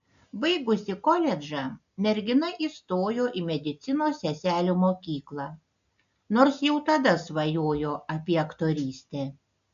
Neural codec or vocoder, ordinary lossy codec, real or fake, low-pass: none; AAC, 96 kbps; real; 7.2 kHz